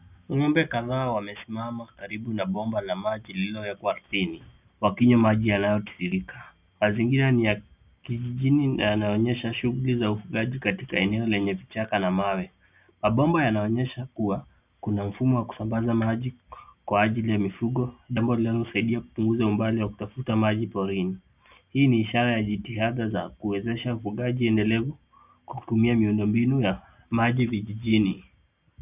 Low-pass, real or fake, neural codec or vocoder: 3.6 kHz; real; none